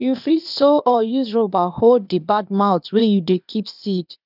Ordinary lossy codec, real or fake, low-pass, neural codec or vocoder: none; fake; 5.4 kHz; codec, 16 kHz, 0.8 kbps, ZipCodec